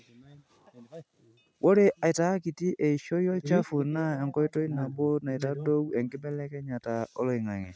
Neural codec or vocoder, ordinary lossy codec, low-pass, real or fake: none; none; none; real